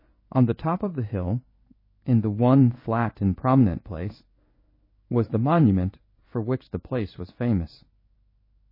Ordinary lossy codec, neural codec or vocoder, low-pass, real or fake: MP3, 24 kbps; codec, 16 kHz in and 24 kHz out, 1 kbps, XY-Tokenizer; 5.4 kHz; fake